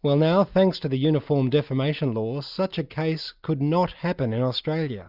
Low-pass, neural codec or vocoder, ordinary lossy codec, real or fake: 5.4 kHz; none; Opus, 64 kbps; real